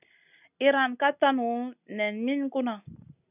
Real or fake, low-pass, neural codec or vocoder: real; 3.6 kHz; none